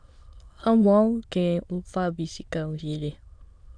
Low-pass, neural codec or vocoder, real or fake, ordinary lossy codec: 9.9 kHz; autoencoder, 22.05 kHz, a latent of 192 numbers a frame, VITS, trained on many speakers; fake; AAC, 64 kbps